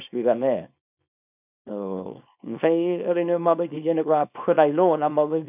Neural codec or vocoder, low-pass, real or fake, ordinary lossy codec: codec, 24 kHz, 0.9 kbps, WavTokenizer, small release; 3.6 kHz; fake; none